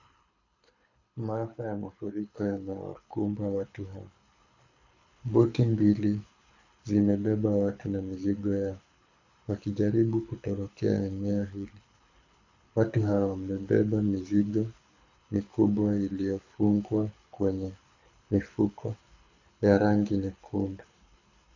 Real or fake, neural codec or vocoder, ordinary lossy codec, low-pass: fake; codec, 24 kHz, 6 kbps, HILCodec; AAC, 48 kbps; 7.2 kHz